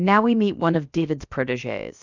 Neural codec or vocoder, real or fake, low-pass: codec, 16 kHz, about 1 kbps, DyCAST, with the encoder's durations; fake; 7.2 kHz